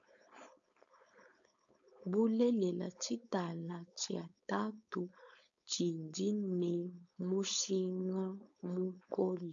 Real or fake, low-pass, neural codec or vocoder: fake; 7.2 kHz; codec, 16 kHz, 4.8 kbps, FACodec